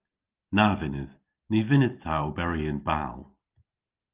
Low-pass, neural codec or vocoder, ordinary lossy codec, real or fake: 3.6 kHz; none; Opus, 32 kbps; real